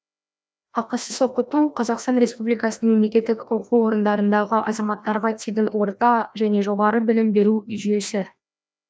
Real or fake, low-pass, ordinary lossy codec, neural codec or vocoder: fake; none; none; codec, 16 kHz, 1 kbps, FreqCodec, larger model